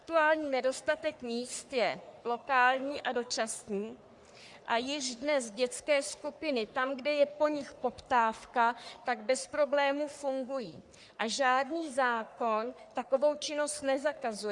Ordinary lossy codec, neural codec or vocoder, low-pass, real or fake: Opus, 64 kbps; codec, 44.1 kHz, 3.4 kbps, Pupu-Codec; 10.8 kHz; fake